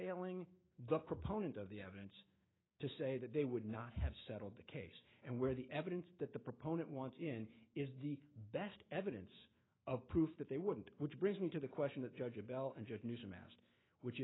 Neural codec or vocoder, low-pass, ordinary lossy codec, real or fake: autoencoder, 48 kHz, 128 numbers a frame, DAC-VAE, trained on Japanese speech; 7.2 kHz; AAC, 16 kbps; fake